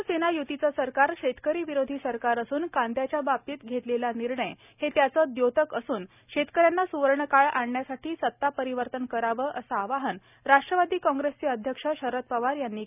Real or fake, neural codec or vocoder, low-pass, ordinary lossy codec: real; none; 3.6 kHz; none